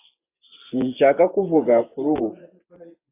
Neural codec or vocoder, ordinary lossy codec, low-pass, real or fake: vocoder, 24 kHz, 100 mel bands, Vocos; AAC, 24 kbps; 3.6 kHz; fake